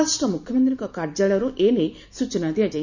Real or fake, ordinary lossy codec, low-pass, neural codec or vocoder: real; none; 7.2 kHz; none